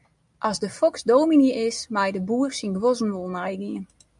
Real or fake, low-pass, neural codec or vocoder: real; 10.8 kHz; none